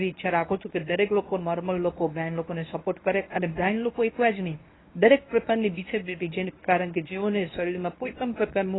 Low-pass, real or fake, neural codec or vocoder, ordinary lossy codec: 7.2 kHz; fake; codec, 24 kHz, 0.9 kbps, WavTokenizer, medium speech release version 1; AAC, 16 kbps